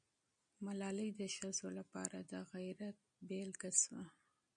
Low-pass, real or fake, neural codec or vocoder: 9.9 kHz; real; none